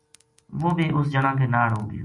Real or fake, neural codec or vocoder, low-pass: real; none; 10.8 kHz